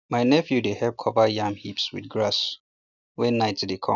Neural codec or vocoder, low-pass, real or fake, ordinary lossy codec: none; 7.2 kHz; real; none